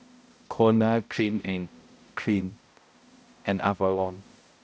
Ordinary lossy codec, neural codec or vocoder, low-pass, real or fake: none; codec, 16 kHz, 0.5 kbps, X-Codec, HuBERT features, trained on balanced general audio; none; fake